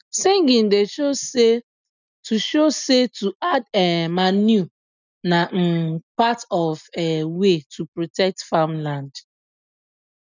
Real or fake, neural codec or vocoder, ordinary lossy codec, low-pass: real; none; none; 7.2 kHz